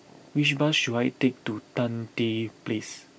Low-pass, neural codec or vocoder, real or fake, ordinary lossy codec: none; none; real; none